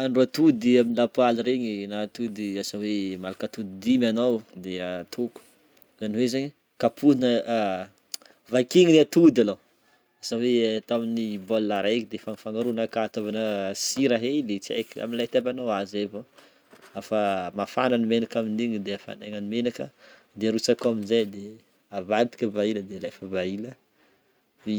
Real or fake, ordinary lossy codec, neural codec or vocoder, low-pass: real; none; none; none